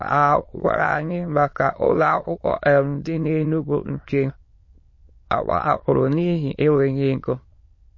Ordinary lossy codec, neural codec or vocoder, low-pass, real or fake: MP3, 32 kbps; autoencoder, 22.05 kHz, a latent of 192 numbers a frame, VITS, trained on many speakers; 7.2 kHz; fake